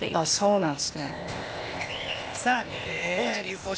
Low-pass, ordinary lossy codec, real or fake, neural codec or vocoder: none; none; fake; codec, 16 kHz, 0.8 kbps, ZipCodec